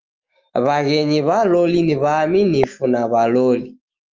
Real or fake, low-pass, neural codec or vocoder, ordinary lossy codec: fake; 7.2 kHz; autoencoder, 48 kHz, 128 numbers a frame, DAC-VAE, trained on Japanese speech; Opus, 24 kbps